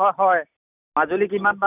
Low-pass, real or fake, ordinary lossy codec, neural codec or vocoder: 3.6 kHz; real; none; none